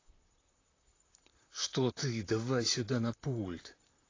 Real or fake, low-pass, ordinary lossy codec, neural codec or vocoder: fake; 7.2 kHz; AAC, 32 kbps; vocoder, 44.1 kHz, 128 mel bands, Pupu-Vocoder